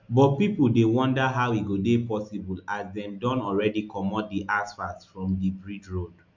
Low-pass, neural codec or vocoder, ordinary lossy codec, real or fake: 7.2 kHz; none; MP3, 64 kbps; real